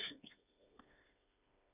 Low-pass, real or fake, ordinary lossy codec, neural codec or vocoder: 3.6 kHz; fake; none; codec, 16 kHz, 4 kbps, FreqCodec, smaller model